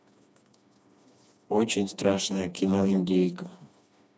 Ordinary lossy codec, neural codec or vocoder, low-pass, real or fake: none; codec, 16 kHz, 2 kbps, FreqCodec, smaller model; none; fake